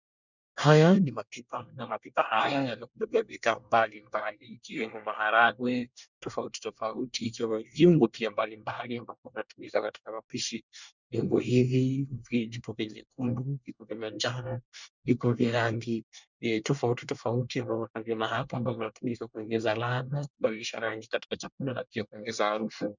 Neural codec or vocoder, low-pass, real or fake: codec, 24 kHz, 1 kbps, SNAC; 7.2 kHz; fake